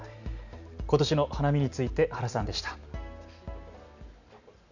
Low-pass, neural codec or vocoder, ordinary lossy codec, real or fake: 7.2 kHz; none; none; real